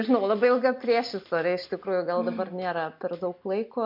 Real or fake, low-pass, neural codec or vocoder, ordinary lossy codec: real; 5.4 kHz; none; MP3, 48 kbps